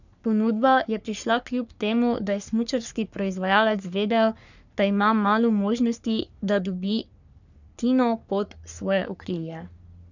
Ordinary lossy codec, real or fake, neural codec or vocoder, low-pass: none; fake; codec, 44.1 kHz, 3.4 kbps, Pupu-Codec; 7.2 kHz